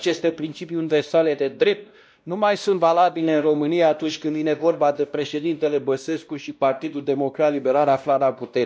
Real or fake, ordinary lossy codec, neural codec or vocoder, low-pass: fake; none; codec, 16 kHz, 1 kbps, X-Codec, WavLM features, trained on Multilingual LibriSpeech; none